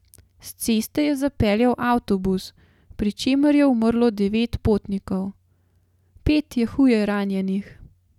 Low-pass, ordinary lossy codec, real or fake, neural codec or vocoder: 19.8 kHz; none; real; none